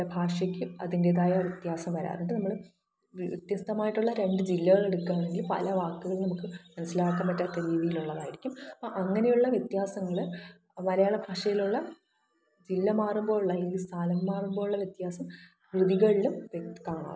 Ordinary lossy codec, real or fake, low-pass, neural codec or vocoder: none; real; none; none